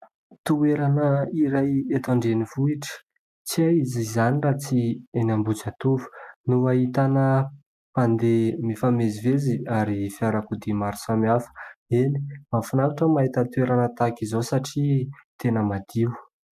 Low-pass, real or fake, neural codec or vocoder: 14.4 kHz; real; none